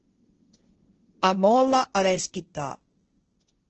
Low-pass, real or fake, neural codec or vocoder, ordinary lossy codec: 7.2 kHz; fake; codec, 16 kHz, 1.1 kbps, Voila-Tokenizer; Opus, 16 kbps